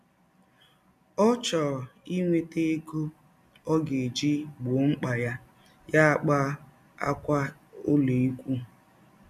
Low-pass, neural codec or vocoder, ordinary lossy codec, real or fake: 14.4 kHz; none; none; real